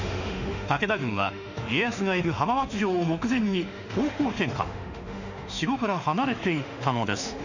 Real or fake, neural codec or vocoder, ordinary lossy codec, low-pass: fake; autoencoder, 48 kHz, 32 numbers a frame, DAC-VAE, trained on Japanese speech; none; 7.2 kHz